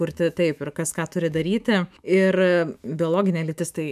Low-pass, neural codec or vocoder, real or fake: 14.4 kHz; autoencoder, 48 kHz, 128 numbers a frame, DAC-VAE, trained on Japanese speech; fake